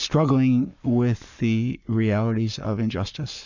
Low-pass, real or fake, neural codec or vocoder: 7.2 kHz; fake; codec, 44.1 kHz, 7.8 kbps, Pupu-Codec